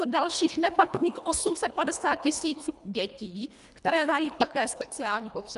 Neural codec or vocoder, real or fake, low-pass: codec, 24 kHz, 1.5 kbps, HILCodec; fake; 10.8 kHz